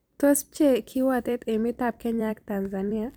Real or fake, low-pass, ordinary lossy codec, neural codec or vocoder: fake; none; none; vocoder, 44.1 kHz, 128 mel bands every 256 samples, BigVGAN v2